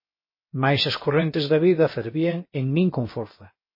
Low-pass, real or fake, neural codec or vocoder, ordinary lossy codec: 5.4 kHz; fake; codec, 16 kHz, 0.7 kbps, FocalCodec; MP3, 24 kbps